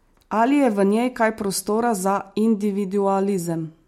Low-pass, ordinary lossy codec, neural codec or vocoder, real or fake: 19.8 kHz; MP3, 64 kbps; none; real